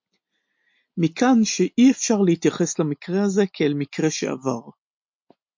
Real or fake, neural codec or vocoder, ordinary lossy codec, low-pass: real; none; MP3, 48 kbps; 7.2 kHz